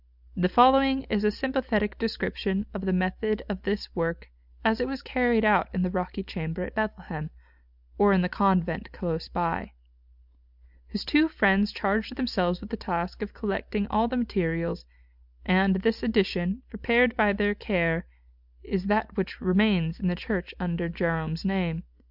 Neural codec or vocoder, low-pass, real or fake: none; 5.4 kHz; real